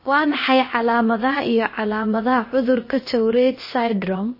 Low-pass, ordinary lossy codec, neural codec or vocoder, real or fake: 5.4 kHz; MP3, 24 kbps; codec, 16 kHz, about 1 kbps, DyCAST, with the encoder's durations; fake